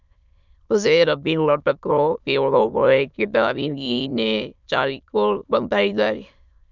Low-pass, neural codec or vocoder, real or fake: 7.2 kHz; autoencoder, 22.05 kHz, a latent of 192 numbers a frame, VITS, trained on many speakers; fake